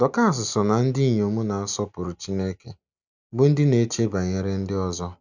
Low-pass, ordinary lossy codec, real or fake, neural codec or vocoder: 7.2 kHz; none; real; none